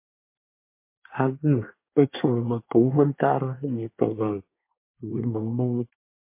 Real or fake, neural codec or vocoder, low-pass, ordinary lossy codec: fake; codec, 24 kHz, 1 kbps, SNAC; 3.6 kHz; MP3, 24 kbps